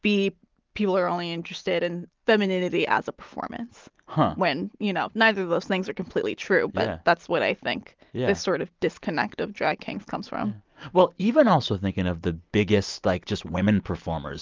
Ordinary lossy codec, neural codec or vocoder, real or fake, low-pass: Opus, 24 kbps; none; real; 7.2 kHz